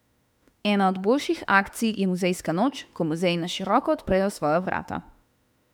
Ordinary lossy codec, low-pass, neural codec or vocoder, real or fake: none; 19.8 kHz; autoencoder, 48 kHz, 32 numbers a frame, DAC-VAE, trained on Japanese speech; fake